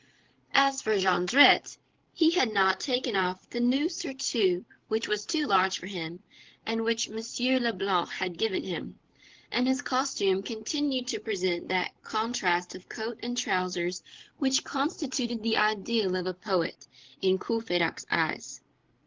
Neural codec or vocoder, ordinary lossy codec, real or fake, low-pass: codec, 16 kHz, 16 kbps, FreqCodec, larger model; Opus, 16 kbps; fake; 7.2 kHz